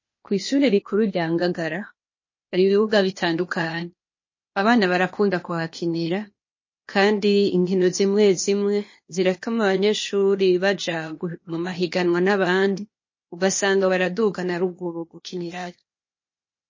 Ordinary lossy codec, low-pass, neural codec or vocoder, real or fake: MP3, 32 kbps; 7.2 kHz; codec, 16 kHz, 0.8 kbps, ZipCodec; fake